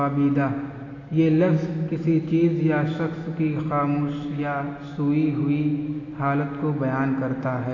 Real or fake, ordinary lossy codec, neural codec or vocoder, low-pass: real; AAC, 32 kbps; none; 7.2 kHz